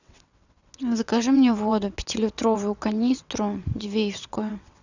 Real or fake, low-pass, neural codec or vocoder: fake; 7.2 kHz; vocoder, 22.05 kHz, 80 mel bands, WaveNeXt